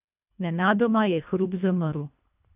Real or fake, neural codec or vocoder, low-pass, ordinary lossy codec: fake; codec, 24 kHz, 1.5 kbps, HILCodec; 3.6 kHz; none